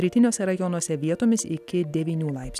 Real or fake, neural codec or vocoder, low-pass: real; none; 14.4 kHz